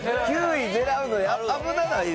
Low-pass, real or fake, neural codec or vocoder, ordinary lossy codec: none; real; none; none